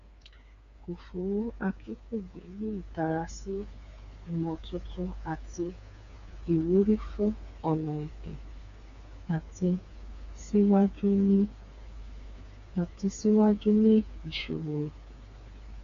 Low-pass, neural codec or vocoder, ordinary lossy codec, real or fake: 7.2 kHz; codec, 16 kHz, 4 kbps, FreqCodec, smaller model; MP3, 64 kbps; fake